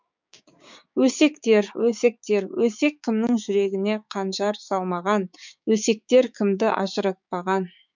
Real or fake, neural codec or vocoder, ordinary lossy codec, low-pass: fake; codec, 16 kHz, 6 kbps, DAC; MP3, 64 kbps; 7.2 kHz